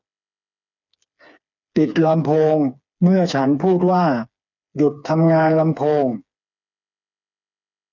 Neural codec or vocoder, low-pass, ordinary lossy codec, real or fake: codec, 16 kHz, 4 kbps, FreqCodec, smaller model; 7.2 kHz; none; fake